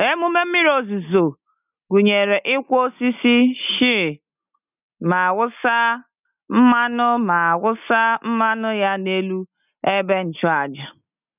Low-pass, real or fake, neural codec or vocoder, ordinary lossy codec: 3.6 kHz; real; none; none